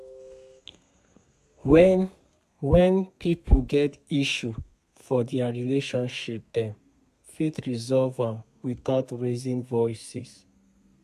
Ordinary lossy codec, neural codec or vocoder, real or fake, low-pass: AAC, 96 kbps; codec, 32 kHz, 1.9 kbps, SNAC; fake; 14.4 kHz